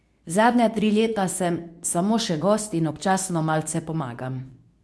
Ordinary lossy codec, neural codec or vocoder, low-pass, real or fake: none; codec, 24 kHz, 0.9 kbps, WavTokenizer, medium speech release version 2; none; fake